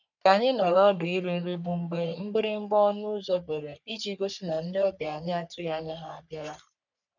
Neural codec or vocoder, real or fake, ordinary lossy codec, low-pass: codec, 44.1 kHz, 3.4 kbps, Pupu-Codec; fake; none; 7.2 kHz